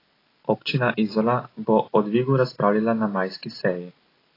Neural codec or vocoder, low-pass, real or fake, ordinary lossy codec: none; 5.4 kHz; real; AAC, 24 kbps